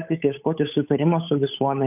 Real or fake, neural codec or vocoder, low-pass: fake; codec, 16 kHz, 8 kbps, FunCodec, trained on Chinese and English, 25 frames a second; 3.6 kHz